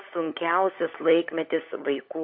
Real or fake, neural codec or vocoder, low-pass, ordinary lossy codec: fake; codec, 16 kHz, 16 kbps, FunCodec, trained on LibriTTS, 50 frames a second; 5.4 kHz; MP3, 32 kbps